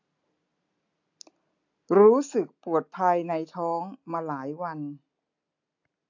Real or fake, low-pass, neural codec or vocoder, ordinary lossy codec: real; 7.2 kHz; none; AAC, 48 kbps